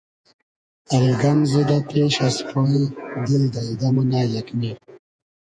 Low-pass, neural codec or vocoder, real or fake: 9.9 kHz; vocoder, 48 kHz, 128 mel bands, Vocos; fake